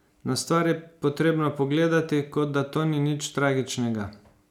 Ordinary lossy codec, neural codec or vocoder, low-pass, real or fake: none; none; 19.8 kHz; real